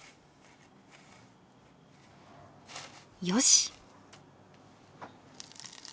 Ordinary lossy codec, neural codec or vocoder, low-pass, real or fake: none; none; none; real